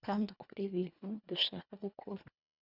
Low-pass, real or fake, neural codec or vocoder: 5.4 kHz; fake; codec, 24 kHz, 1.5 kbps, HILCodec